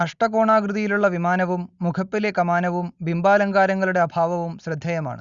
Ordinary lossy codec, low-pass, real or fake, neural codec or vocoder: Opus, 64 kbps; 7.2 kHz; real; none